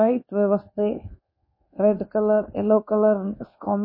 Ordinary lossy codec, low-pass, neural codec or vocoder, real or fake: MP3, 24 kbps; 5.4 kHz; codec, 24 kHz, 1.2 kbps, DualCodec; fake